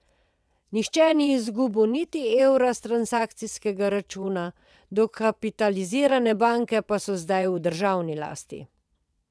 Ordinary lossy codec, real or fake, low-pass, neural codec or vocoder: none; fake; none; vocoder, 22.05 kHz, 80 mel bands, WaveNeXt